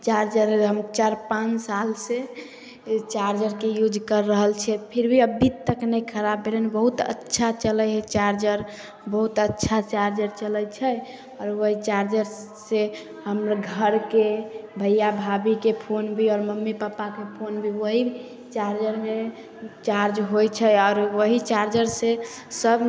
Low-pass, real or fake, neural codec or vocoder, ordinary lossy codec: none; real; none; none